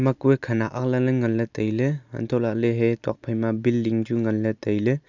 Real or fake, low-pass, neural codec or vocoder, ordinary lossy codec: real; 7.2 kHz; none; none